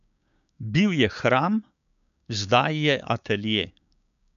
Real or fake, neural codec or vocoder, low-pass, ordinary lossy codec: fake; codec, 16 kHz, 6 kbps, DAC; 7.2 kHz; none